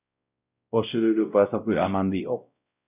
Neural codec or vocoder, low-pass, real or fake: codec, 16 kHz, 0.5 kbps, X-Codec, WavLM features, trained on Multilingual LibriSpeech; 3.6 kHz; fake